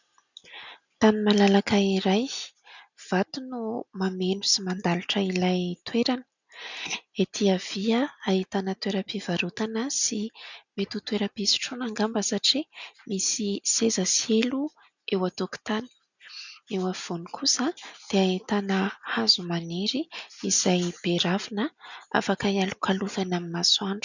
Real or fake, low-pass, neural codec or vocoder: real; 7.2 kHz; none